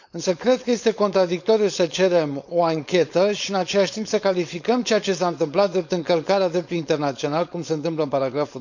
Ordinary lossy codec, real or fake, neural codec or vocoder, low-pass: none; fake; codec, 16 kHz, 4.8 kbps, FACodec; 7.2 kHz